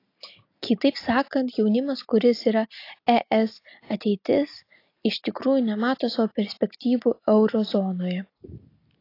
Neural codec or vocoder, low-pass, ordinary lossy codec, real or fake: none; 5.4 kHz; AAC, 32 kbps; real